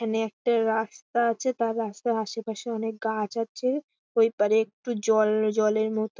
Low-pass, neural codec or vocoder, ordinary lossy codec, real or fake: none; none; none; real